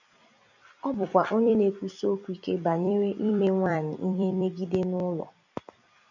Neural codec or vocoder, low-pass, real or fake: vocoder, 44.1 kHz, 128 mel bands every 256 samples, BigVGAN v2; 7.2 kHz; fake